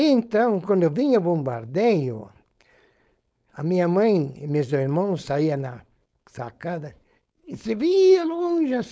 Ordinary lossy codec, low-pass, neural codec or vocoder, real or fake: none; none; codec, 16 kHz, 4.8 kbps, FACodec; fake